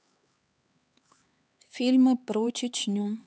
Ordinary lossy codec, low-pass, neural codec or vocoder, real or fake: none; none; codec, 16 kHz, 4 kbps, X-Codec, HuBERT features, trained on LibriSpeech; fake